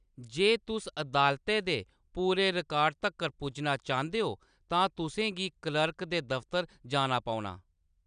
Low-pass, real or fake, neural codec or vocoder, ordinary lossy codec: 9.9 kHz; real; none; none